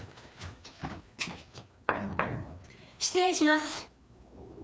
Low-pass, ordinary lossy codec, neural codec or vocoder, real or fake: none; none; codec, 16 kHz, 2 kbps, FreqCodec, larger model; fake